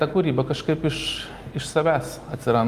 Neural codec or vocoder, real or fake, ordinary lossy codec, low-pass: none; real; Opus, 32 kbps; 14.4 kHz